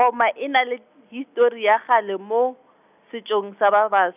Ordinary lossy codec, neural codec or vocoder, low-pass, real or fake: none; none; 3.6 kHz; real